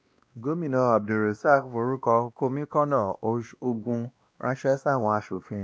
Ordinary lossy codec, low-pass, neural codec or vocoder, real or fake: none; none; codec, 16 kHz, 1 kbps, X-Codec, WavLM features, trained on Multilingual LibriSpeech; fake